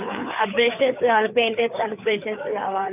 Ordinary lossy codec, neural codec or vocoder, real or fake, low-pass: none; codec, 16 kHz, 4 kbps, FreqCodec, larger model; fake; 3.6 kHz